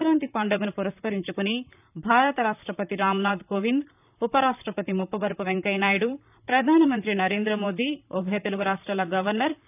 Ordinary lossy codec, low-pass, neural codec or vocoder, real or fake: none; 3.6 kHz; vocoder, 44.1 kHz, 128 mel bands, Pupu-Vocoder; fake